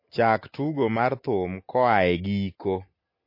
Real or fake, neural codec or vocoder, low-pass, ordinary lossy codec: real; none; 5.4 kHz; MP3, 32 kbps